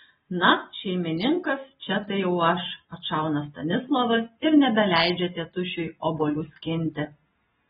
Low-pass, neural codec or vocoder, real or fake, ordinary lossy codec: 19.8 kHz; none; real; AAC, 16 kbps